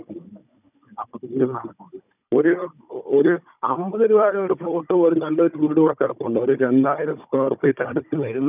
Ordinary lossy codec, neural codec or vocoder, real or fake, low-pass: none; codec, 16 kHz, 16 kbps, FunCodec, trained on Chinese and English, 50 frames a second; fake; 3.6 kHz